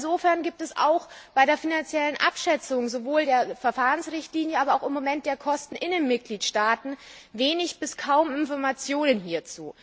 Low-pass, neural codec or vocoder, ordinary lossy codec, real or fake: none; none; none; real